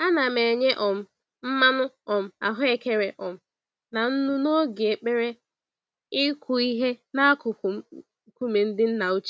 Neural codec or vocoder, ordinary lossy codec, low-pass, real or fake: none; none; none; real